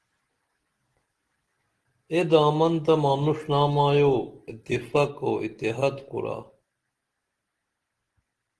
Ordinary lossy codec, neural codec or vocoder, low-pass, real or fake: Opus, 16 kbps; none; 10.8 kHz; real